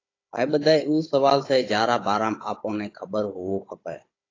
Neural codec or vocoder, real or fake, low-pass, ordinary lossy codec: codec, 16 kHz, 16 kbps, FunCodec, trained on Chinese and English, 50 frames a second; fake; 7.2 kHz; AAC, 32 kbps